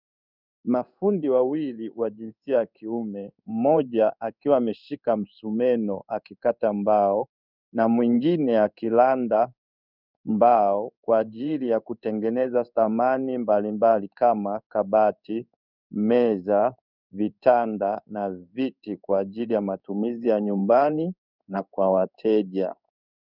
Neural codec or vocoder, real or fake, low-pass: codec, 16 kHz in and 24 kHz out, 1 kbps, XY-Tokenizer; fake; 5.4 kHz